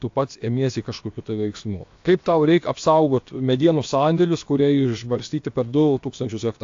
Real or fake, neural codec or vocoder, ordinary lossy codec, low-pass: fake; codec, 16 kHz, about 1 kbps, DyCAST, with the encoder's durations; AAC, 48 kbps; 7.2 kHz